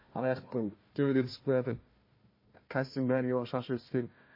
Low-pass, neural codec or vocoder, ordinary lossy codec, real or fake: 5.4 kHz; codec, 16 kHz, 1 kbps, FunCodec, trained on Chinese and English, 50 frames a second; MP3, 24 kbps; fake